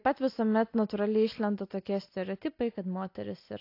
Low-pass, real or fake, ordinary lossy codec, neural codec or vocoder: 5.4 kHz; real; AAC, 32 kbps; none